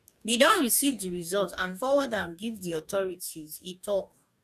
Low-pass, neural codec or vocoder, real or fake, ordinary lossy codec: 14.4 kHz; codec, 44.1 kHz, 2.6 kbps, DAC; fake; none